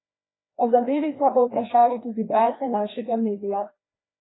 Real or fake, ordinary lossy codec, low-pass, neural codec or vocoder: fake; AAC, 16 kbps; 7.2 kHz; codec, 16 kHz, 1 kbps, FreqCodec, larger model